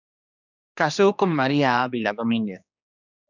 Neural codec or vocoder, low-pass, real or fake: codec, 16 kHz, 2 kbps, X-Codec, HuBERT features, trained on general audio; 7.2 kHz; fake